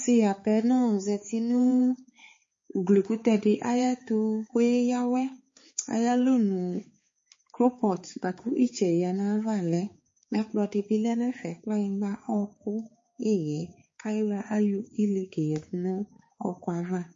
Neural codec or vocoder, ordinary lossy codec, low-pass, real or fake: codec, 16 kHz, 4 kbps, X-Codec, HuBERT features, trained on balanced general audio; MP3, 32 kbps; 7.2 kHz; fake